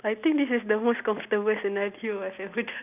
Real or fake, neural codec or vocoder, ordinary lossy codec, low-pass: real; none; none; 3.6 kHz